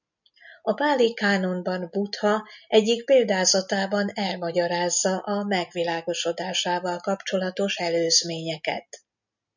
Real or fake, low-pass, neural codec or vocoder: real; 7.2 kHz; none